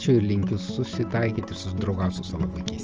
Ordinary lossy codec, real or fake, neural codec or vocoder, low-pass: Opus, 24 kbps; real; none; 7.2 kHz